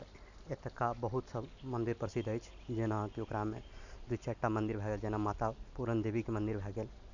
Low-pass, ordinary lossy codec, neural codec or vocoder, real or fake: 7.2 kHz; none; none; real